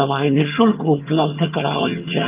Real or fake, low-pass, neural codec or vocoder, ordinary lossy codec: fake; 3.6 kHz; vocoder, 22.05 kHz, 80 mel bands, HiFi-GAN; Opus, 24 kbps